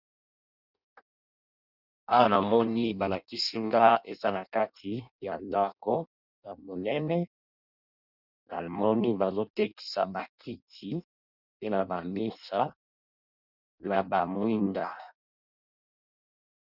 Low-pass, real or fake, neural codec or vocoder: 5.4 kHz; fake; codec, 16 kHz in and 24 kHz out, 0.6 kbps, FireRedTTS-2 codec